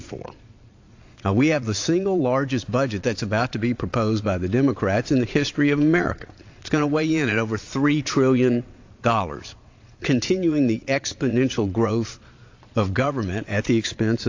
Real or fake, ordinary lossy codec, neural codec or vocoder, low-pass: fake; AAC, 48 kbps; vocoder, 22.05 kHz, 80 mel bands, Vocos; 7.2 kHz